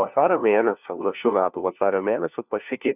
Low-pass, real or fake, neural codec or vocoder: 3.6 kHz; fake; codec, 16 kHz, 1 kbps, FunCodec, trained on LibriTTS, 50 frames a second